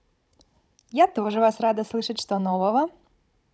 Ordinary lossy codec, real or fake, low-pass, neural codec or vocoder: none; fake; none; codec, 16 kHz, 16 kbps, FunCodec, trained on Chinese and English, 50 frames a second